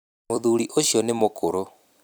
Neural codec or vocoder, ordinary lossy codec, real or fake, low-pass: vocoder, 44.1 kHz, 128 mel bands every 256 samples, BigVGAN v2; none; fake; none